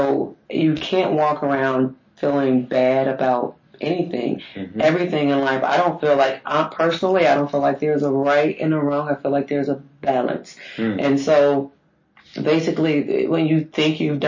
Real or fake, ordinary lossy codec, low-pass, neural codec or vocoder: real; MP3, 32 kbps; 7.2 kHz; none